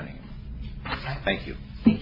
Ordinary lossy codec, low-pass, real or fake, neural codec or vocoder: MP3, 24 kbps; 5.4 kHz; real; none